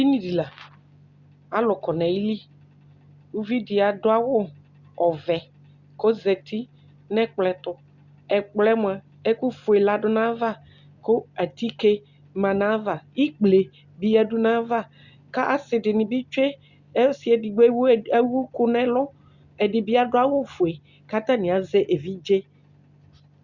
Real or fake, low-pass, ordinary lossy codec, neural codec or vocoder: real; 7.2 kHz; Opus, 64 kbps; none